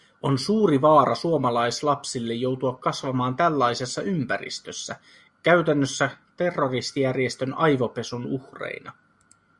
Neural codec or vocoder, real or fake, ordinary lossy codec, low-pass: none; real; Opus, 64 kbps; 10.8 kHz